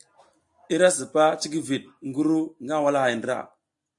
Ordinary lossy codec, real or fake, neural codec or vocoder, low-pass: AAC, 64 kbps; real; none; 10.8 kHz